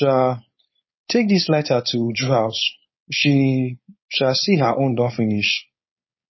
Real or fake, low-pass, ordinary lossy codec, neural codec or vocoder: fake; 7.2 kHz; MP3, 24 kbps; codec, 16 kHz, 4.8 kbps, FACodec